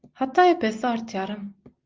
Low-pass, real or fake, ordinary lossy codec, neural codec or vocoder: 7.2 kHz; real; Opus, 32 kbps; none